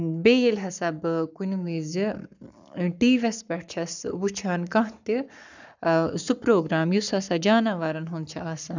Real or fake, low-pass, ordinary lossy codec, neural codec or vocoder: fake; 7.2 kHz; none; codec, 44.1 kHz, 7.8 kbps, Pupu-Codec